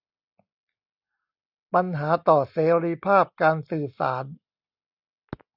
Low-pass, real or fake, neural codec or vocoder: 5.4 kHz; real; none